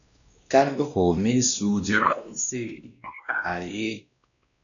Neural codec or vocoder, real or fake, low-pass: codec, 16 kHz, 1 kbps, X-Codec, WavLM features, trained on Multilingual LibriSpeech; fake; 7.2 kHz